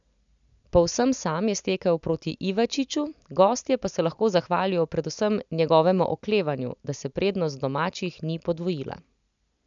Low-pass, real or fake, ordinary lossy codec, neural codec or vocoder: 7.2 kHz; real; none; none